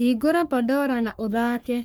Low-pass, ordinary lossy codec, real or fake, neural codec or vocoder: none; none; fake; codec, 44.1 kHz, 3.4 kbps, Pupu-Codec